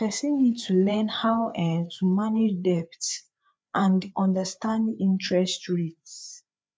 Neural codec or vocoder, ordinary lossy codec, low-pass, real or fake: codec, 16 kHz, 4 kbps, FreqCodec, larger model; none; none; fake